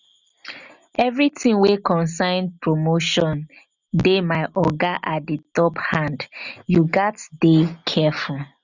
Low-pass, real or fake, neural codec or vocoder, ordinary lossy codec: 7.2 kHz; real; none; none